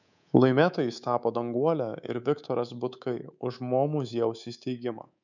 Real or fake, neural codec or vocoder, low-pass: fake; codec, 24 kHz, 3.1 kbps, DualCodec; 7.2 kHz